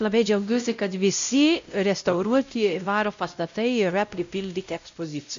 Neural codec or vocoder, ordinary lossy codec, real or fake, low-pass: codec, 16 kHz, 0.5 kbps, X-Codec, WavLM features, trained on Multilingual LibriSpeech; MP3, 64 kbps; fake; 7.2 kHz